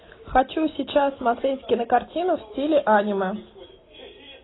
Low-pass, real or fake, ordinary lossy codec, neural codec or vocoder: 7.2 kHz; real; AAC, 16 kbps; none